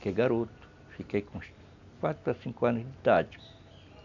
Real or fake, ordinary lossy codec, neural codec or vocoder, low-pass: real; none; none; 7.2 kHz